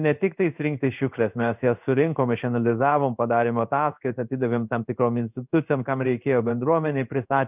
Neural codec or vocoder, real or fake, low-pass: codec, 16 kHz in and 24 kHz out, 1 kbps, XY-Tokenizer; fake; 3.6 kHz